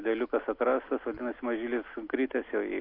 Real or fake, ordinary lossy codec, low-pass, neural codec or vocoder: real; AAC, 32 kbps; 5.4 kHz; none